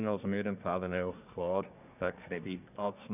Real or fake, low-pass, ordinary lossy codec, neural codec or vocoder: fake; 3.6 kHz; none; codec, 24 kHz, 1 kbps, SNAC